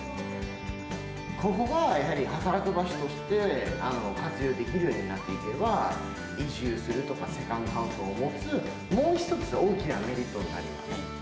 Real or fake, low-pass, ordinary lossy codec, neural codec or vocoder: real; none; none; none